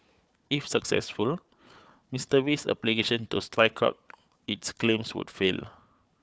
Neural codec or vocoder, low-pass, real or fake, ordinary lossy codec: codec, 16 kHz, 4 kbps, FunCodec, trained on Chinese and English, 50 frames a second; none; fake; none